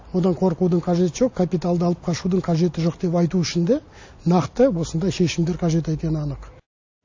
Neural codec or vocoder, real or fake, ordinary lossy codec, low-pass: none; real; AAC, 48 kbps; 7.2 kHz